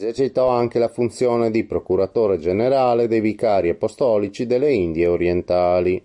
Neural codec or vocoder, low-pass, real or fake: none; 10.8 kHz; real